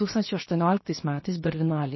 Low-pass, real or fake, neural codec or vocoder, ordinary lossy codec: 7.2 kHz; fake; codec, 16 kHz, 0.7 kbps, FocalCodec; MP3, 24 kbps